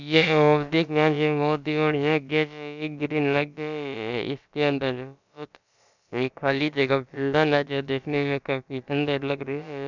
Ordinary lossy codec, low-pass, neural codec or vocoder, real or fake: none; 7.2 kHz; codec, 16 kHz, about 1 kbps, DyCAST, with the encoder's durations; fake